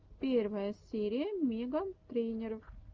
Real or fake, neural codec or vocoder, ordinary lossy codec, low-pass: real; none; Opus, 24 kbps; 7.2 kHz